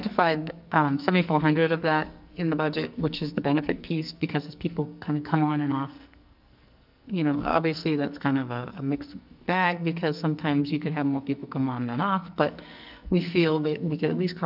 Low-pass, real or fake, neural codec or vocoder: 5.4 kHz; fake; codec, 44.1 kHz, 2.6 kbps, SNAC